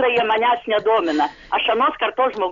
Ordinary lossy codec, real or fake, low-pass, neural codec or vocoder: Opus, 64 kbps; real; 7.2 kHz; none